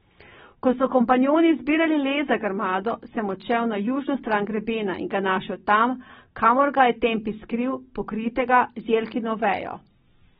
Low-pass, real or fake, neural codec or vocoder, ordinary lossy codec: 10.8 kHz; real; none; AAC, 16 kbps